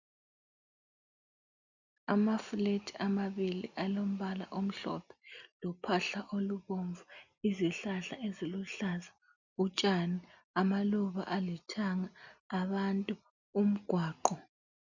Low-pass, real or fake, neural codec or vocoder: 7.2 kHz; real; none